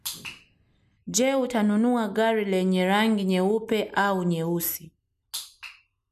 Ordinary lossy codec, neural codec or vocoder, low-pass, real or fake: none; none; 14.4 kHz; real